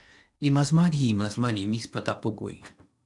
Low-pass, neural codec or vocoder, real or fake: 10.8 kHz; codec, 16 kHz in and 24 kHz out, 0.8 kbps, FocalCodec, streaming, 65536 codes; fake